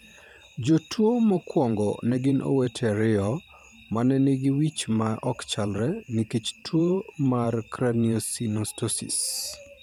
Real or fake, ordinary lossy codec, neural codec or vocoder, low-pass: fake; none; vocoder, 44.1 kHz, 128 mel bands every 512 samples, BigVGAN v2; 19.8 kHz